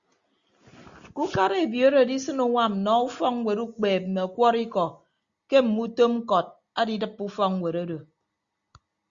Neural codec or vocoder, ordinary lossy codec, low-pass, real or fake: none; Opus, 64 kbps; 7.2 kHz; real